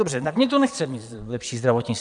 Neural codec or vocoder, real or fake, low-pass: vocoder, 22.05 kHz, 80 mel bands, Vocos; fake; 9.9 kHz